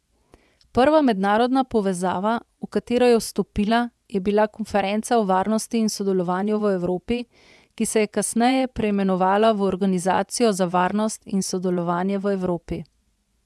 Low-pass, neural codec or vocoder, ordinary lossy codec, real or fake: none; vocoder, 24 kHz, 100 mel bands, Vocos; none; fake